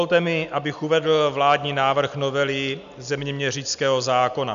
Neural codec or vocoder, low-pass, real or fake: none; 7.2 kHz; real